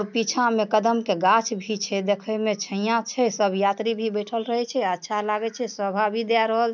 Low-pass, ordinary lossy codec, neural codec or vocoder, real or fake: 7.2 kHz; none; none; real